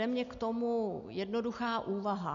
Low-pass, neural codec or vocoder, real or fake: 7.2 kHz; none; real